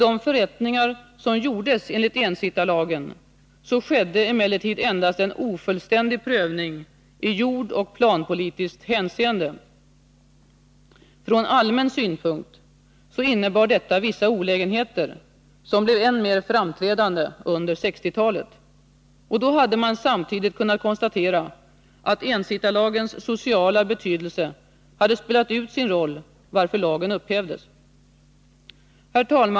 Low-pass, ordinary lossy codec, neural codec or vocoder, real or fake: none; none; none; real